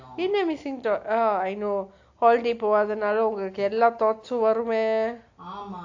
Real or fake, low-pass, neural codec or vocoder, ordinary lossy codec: real; 7.2 kHz; none; AAC, 48 kbps